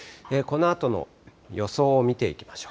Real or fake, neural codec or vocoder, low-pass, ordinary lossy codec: real; none; none; none